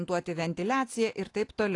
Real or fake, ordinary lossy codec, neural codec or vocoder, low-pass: real; AAC, 48 kbps; none; 10.8 kHz